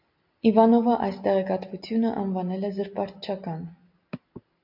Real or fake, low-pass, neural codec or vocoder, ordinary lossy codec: real; 5.4 kHz; none; AAC, 48 kbps